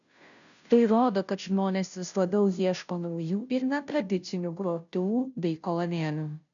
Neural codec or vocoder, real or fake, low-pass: codec, 16 kHz, 0.5 kbps, FunCodec, trained on Chinese and English, 25 frames a second; fake; 7.2 kHz